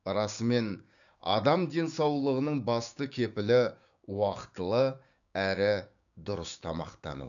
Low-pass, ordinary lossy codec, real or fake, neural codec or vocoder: 7.2 kHz; none; fake; codec, 16 kHz, 6 kbps, DAC